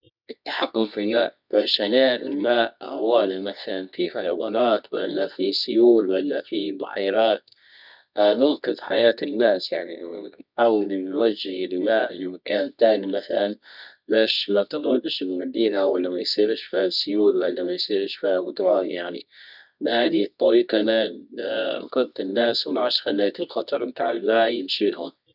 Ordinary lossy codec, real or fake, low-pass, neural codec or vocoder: none; fake; 5.4 kHz; codec, 24 kHz, 0.9 kbps, WavTokenizer, medium music audio release